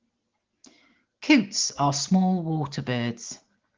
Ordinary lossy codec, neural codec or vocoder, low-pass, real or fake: Opus, 16 kbps; none; 7.2 kHz; real